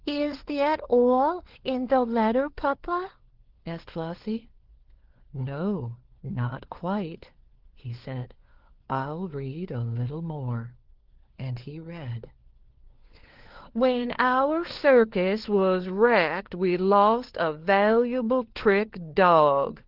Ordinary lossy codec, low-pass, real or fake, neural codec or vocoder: Opus, 16 kbps; 5.4 kHz; fake; codec, 16 kHz, 4 kbps, FunCodec, trained on LibriTTS, 50 frames a second